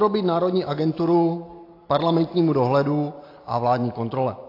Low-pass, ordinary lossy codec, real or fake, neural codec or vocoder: 5.4 kHz; AAC, 32 kbps; real; none